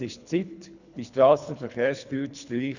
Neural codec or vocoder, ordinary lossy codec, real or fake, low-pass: codec, 24 kHz, 3 kbps, HILCodec; none; fake; 7.2 kHz